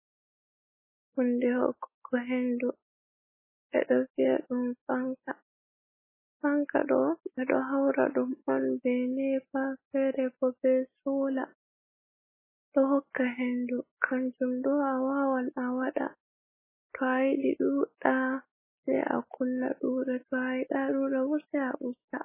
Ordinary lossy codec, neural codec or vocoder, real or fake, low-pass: MP3, 16 kbps; none; real; 3.6 kHz